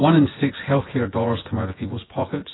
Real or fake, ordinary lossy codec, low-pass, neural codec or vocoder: fake; AAC, 16 kbps; 7.2 kHz; vocoder, 24 kHz, 100 mel bands, Vocos